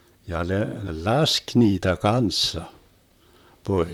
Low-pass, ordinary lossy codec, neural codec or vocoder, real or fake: 19.8 kHz; none; vocoder, 44.1 kHz, 128 mel bands, Pupu-Vocoder; fake